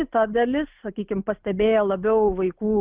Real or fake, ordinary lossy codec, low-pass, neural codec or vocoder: real; Opus, 24 kbps; 3.6 kHz; none